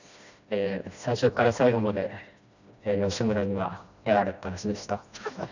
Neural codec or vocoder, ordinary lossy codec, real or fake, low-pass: codec, 16 kHz, 1 kbps, FreqCodec, smaller model; none; fake; 7.2 kHz